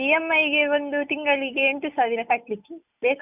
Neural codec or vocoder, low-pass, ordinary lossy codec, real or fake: none; 3.6 kHz; none; real